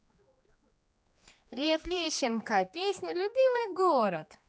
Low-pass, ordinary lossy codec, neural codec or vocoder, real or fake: none; none; codec, 16 kHz, 2 kbps, X-Codec, HuBERT features, trained on general audio; fake